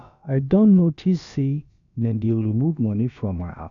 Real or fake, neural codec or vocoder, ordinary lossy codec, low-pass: fake; codec, 16 kHz, about 1 kbps, DyCAST, with the encoder's durations; none; 7.2 kHz